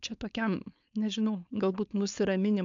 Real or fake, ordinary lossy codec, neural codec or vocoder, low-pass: fake; AAC, 64 kbps; codec, 16 kHz, 4.8 kbps, FACodec; 7.2 kHz